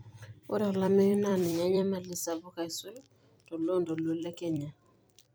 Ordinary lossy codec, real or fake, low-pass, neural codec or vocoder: none; fake; none; vocoder, 44.1 kHz, 128 mel bands every 256 samples, BigVGAN v2